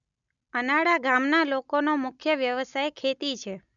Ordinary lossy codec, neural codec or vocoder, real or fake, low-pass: none; none; real; 7.2 kHz